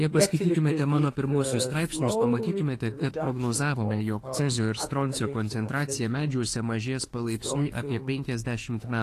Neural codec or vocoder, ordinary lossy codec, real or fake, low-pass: autoencoder, 48 kHz, 32 numbers a frame, DAC-VAE, trained on Japanese speech; AAC, 48 kbps; fake; 14.4 kHz